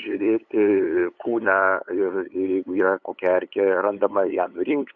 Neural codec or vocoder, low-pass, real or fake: codec, 16 kHz, 8 kbps, FunCodec, trained on LibriTTS, 25 frames a second; 7.2 kHz; fake